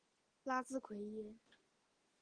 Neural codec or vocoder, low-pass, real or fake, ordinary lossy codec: none; 9.9 kHz; real; Opus, 16 kbps